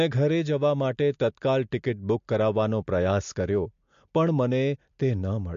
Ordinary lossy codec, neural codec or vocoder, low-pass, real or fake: MP3, 48 kbps; none; 7.2 kHz; real